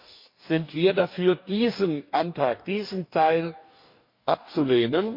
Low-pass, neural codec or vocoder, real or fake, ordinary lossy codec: 5.4 kHz; codec, 44.1 kHz, 2.6 kbps, DAC; fake; MP3, 32 kbps